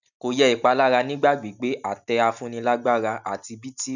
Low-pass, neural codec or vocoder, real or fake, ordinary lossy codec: 7.2 kHz; none; real; none